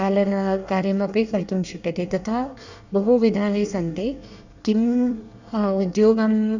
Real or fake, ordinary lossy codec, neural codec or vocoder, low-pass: fake; none; codec, 24 kHz, 1 kbps, SNAC; 7.2 kHz